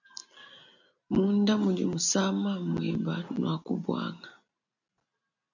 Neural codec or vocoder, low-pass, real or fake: none; 7.2 kHz; real